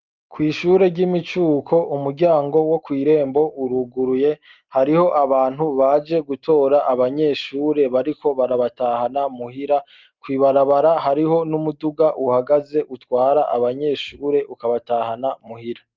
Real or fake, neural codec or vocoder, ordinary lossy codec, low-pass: real; none; Opus, 32 kbps; 7.2 kHz